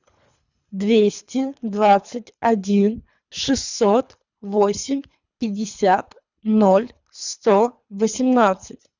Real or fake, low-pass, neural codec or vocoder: fake; 7.2 kHz; codec, 24 kHz, 3 kbps, HILCodec